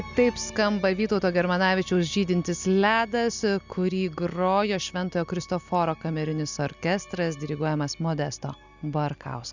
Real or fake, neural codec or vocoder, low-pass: real; none; 7.2 kHz